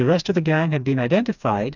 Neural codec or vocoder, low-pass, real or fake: codec, 16 kHz, 2 kbps, FreqCodec, smaller model; 7.2 kHz; fake